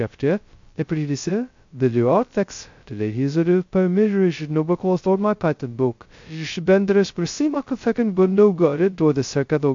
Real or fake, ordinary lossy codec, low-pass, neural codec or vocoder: fake; MP3, 48 kbps; 7.2 kHz; codec, 16 kHz, 0.2 kbps, FocalCodec